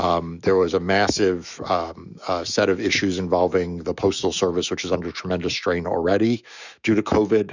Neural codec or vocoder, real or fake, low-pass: autoencoder, 48 kHz, 128 numbers a frame, DAC-VAE, trained on Japanese speech; fake; 7.2 kHz